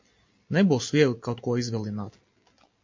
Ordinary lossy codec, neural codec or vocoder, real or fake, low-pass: MP3, 48 kbps; none; real; 7.2 kHz